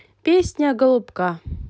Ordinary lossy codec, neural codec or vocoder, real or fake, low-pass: none; none; real; none